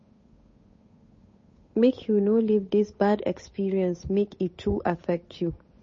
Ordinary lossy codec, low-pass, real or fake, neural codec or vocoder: MP3, 32 kbps; 7.2 kHz; fake; codec, 16 kHz, 8 kbps, FunCodec, trained on Chinese and English, 25 frames a second